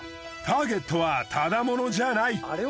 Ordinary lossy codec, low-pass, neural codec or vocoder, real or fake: none; none; none; real